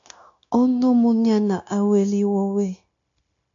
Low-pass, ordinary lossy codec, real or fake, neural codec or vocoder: 7.2 kHz; AAC, 64 kbps; fake; codec, 16 kHz, 0.9 kbps, LongCat-Audio-Codec